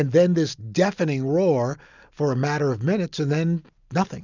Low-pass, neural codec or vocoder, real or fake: 7.2 kHz; none; real